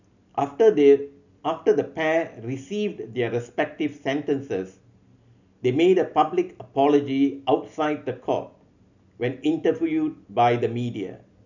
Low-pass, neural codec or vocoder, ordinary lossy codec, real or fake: 7.2 kHz; none; none; real